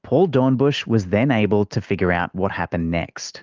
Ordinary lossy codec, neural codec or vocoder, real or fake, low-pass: Opus, 32 kbps; none; real; 7.2 kHz